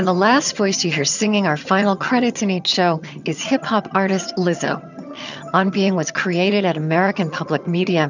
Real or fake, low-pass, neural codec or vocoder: fake; 7.2 kHz; vocoder, 22.05 kHz, 80 mel bands, HiFi-GAN